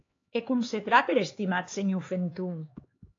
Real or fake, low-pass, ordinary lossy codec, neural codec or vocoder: fake; 7.2 kHz; AAC, 32 kbps; codec, 16 kHz, 4 kbps, X-Codec, HuBERT features, trained on LibriSpeech